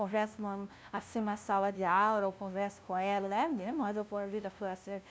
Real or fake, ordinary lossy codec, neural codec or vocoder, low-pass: fake; none; codec, 16 kHz, 0.5 kbps, FunCodec, trained on LibriTTS, 25 frames a second; none